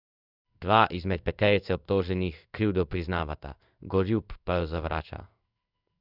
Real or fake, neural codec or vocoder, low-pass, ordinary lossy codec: fake; codec, 16 kHz in and 24 kHz out, 1 kbps, XY-Tokenizer; 5.4 kHz; none